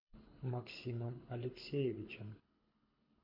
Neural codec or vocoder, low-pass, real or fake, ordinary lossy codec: codec, 24 kHz, 6 kbps, HILCodec; 5.4 kHz; fake; MP3, 32 kbps